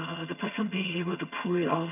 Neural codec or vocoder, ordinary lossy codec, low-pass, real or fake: vocoder, 22.05 kHz, 80 mel bands, HiFi-GAN; none; 3.6 kHz; fake